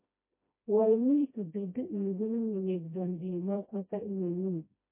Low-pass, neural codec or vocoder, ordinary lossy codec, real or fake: 3.6 kHz; codec, 16 kHz, 1 kbps, FreqCodec, smaller model; MP3, 24 kbps; fake